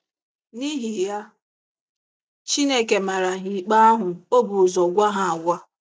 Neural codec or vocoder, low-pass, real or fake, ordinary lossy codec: none; none; real; none